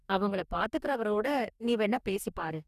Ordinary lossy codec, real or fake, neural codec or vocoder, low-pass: none; fake; codec, 44.1 kHz, 2.6 kbps, DAC; 14.4 kHz